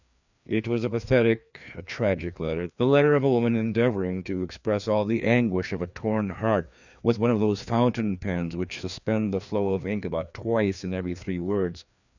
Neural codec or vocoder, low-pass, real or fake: codec, 16 kHz, 2 kbps, FreqCodec, larger model; 7.2 kHz; fake